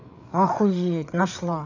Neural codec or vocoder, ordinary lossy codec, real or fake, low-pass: codec, 16 kHz, 8 kbps, FreqCodec, larger model; none; fake; 7.2 kHz